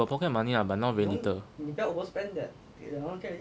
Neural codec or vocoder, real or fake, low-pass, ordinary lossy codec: none; real; none; none